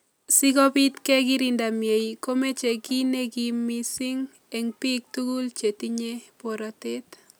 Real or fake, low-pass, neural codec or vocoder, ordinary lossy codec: real; none; none; none